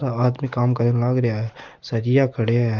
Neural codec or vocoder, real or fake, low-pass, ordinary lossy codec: none; real; 7.2 kHz; Opus, 32 kbps